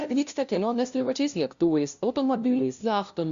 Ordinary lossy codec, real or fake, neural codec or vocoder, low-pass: Opus, 64 kbps; fake; codec, 16 kHz, 0.5 kbps, FunCodec, trained on LibriTTS, 25 frames a second; 7.2 kHz